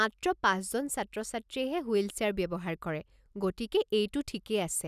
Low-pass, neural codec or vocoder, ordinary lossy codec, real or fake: 14.4 kHz; none; none; real